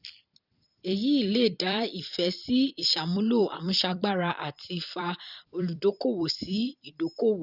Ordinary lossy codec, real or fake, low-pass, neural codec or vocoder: none; fake; 5.4 kHz; vocoder, 44.1 kHz, 128 mel bands every 256 samples, BigVGAN v2